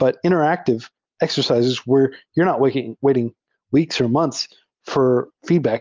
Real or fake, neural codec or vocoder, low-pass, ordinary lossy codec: real; none; 7.2 kHz; Opus, 24 kbps